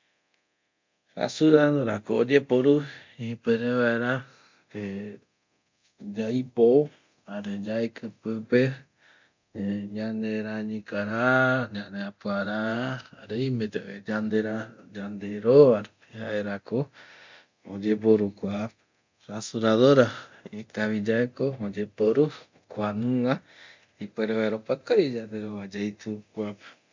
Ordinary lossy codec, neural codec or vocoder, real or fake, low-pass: MP3, 64 kbps; codec, 24 kHz, 0.9 kbps, DualCodec; fake; 7.2 kHz